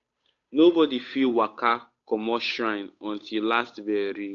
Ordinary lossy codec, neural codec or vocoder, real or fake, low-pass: AAC, 48 kbps; codec, 16 kHz, 8 kbps, FunCodec, trained on Chinese and English, 25 frames a second; fake; 7.2 kHz